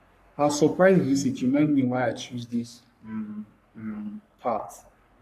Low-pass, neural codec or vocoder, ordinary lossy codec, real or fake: 14.4 kHz; codec, 44.1 kHz, 3.4 kbps, Pupu-Codec; none; fake